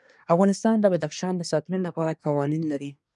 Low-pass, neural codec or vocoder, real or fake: 10.8 kHz; codec, 24 kHz, 1 kbps, SNAC; fake